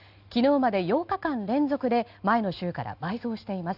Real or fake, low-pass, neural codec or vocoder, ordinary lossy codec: real; 5.4 kHz; none; Opus, 64 kbps